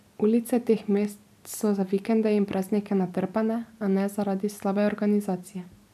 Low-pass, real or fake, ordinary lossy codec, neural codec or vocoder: 14.4 kHz; real; AAC, 96 kbps; none